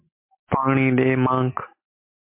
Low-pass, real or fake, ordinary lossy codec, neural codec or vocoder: 3.6 kHz; real; MP3, 24 kbps; none